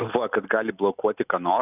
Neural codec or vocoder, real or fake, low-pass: none; real; 3.6 kHz